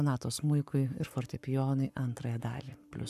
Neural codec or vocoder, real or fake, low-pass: codec, 44.1 kHz, 7.8 kbps, Pupu-Codec; fake; 14.4 kHz